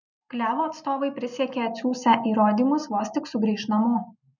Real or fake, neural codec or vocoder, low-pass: real; none; 7.2 kHz